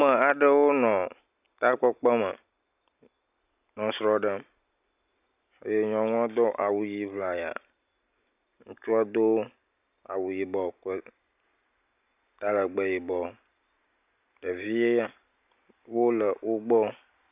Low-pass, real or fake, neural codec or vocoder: 3.6 kHz; real; none